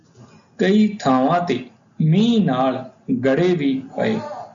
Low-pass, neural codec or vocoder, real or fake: 7.2 kHz; none; real